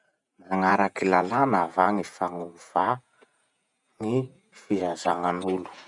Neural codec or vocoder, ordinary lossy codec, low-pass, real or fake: vocoder, 48 kHz, 128 mel bands, Vocos; none; 10.8 kHz; fake